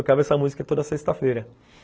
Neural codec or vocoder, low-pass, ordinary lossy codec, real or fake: none; none; none; real